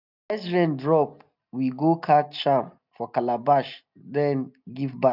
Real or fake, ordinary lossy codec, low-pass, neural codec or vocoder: real; none; 5.4 kHz; none